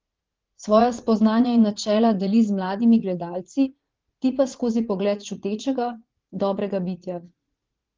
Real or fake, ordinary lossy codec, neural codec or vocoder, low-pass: fake; Opus, 16 kbps; vocoder, 44.1 kHz, 80 mel bands, Vocos; 7.2 kHz